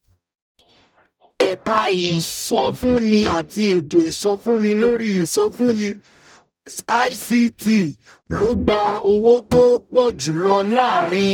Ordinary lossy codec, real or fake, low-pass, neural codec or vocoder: none; fake; 19.8 kHz; codec, 44.1 kHz, 0.9 kbps, DAC